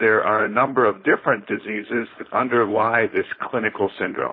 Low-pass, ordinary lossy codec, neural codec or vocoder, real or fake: 5.4 kHz; MP3, 24 kbps; codec, 16 kHz, 4.8 kbps, FACodec; fake